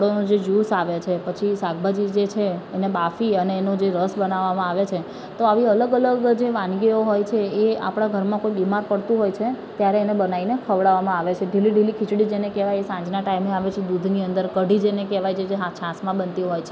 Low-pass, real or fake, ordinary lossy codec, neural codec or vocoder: none; real; none; none